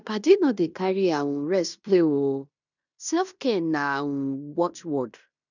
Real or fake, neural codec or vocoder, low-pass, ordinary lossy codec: fake; codec, 16 kHz in and 24 kHz out, 0.9 kbps, LongCat-Audio-Codec, fine tuned four codebook decoder; 7.2 kHz; none